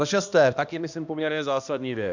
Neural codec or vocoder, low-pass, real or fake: codec, 16 kHz, 1 kbps, X-Codec, HuBERT features, trained on balanced general audio; 7.2 kHz; fake